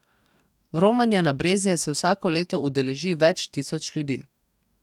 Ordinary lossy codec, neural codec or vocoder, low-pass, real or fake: none; codec, 44.1 kHz, 2.6 kbps, DAC; 19.8 kHz; fake